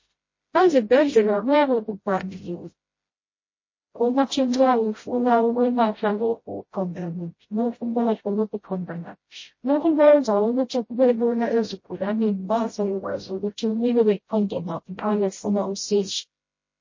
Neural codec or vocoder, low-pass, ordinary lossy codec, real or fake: codec, 16 kHz, 0.5 kbps, FreqCodec, smaller model; 7.2 kHz; MP3, 32 kbps; fake